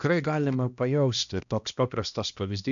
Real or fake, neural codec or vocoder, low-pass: fake; codec, 16 kHz, 1 kbps, X-Codec, HuBERT features, trained on balanced general audio; 7.2 kHz